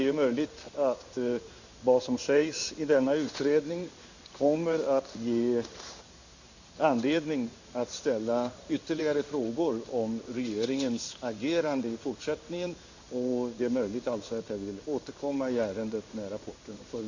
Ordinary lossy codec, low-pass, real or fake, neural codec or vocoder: Opus, 64 kbps; 7.2 kHz; fake; codec, 16 kHz in and 24 kHz out, 1 kbps, XY-Tokenizer